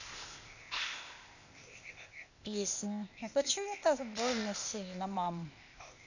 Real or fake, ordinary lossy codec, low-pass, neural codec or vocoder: fake; none; 7.2 kHz; codec, 16 kHz, 0.8 kbps, ZipCodec